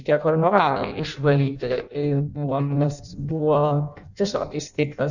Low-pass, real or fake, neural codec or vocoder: 7.2 kHz; fake; codec, 16 kHz in and 24 kHz out, 0.6 kbps, FireRedTTS-2 codec